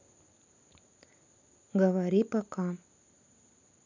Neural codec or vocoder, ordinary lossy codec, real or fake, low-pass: none; none; real; 7.2 kHz